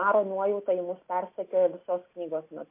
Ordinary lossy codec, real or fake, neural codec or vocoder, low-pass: MP3, 24 kbps; real; none; 3.6 kHz